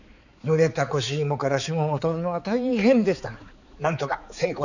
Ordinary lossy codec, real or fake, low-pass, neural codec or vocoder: none; fake; 7.2 kHz; codec, 16 kHz, 4 kbps, X-Codec, HuBERT features, trained on balanced general audio